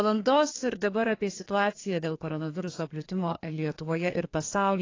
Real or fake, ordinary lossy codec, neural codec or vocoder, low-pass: fake; AAC, 32 kbps; codec, 32 kHz, 1.9 kbps, SNAC; 7.2 kHz